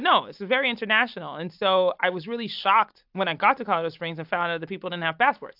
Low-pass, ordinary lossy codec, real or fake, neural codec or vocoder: 5.4 kHz; AAC, 48 kbps; real; none